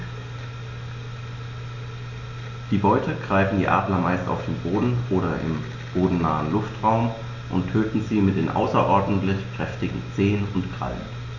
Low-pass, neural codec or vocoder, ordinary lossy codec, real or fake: 7.2 kHz; none; AAC, 48 kbps; real